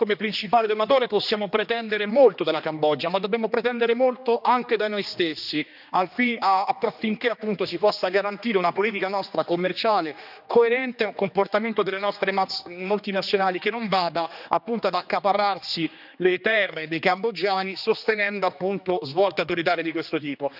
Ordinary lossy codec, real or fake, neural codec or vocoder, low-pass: none; fake; codec, 16 kHz, 2 kbps, X-Codec, HuBERT features, trained on general audio; 5.4 kHz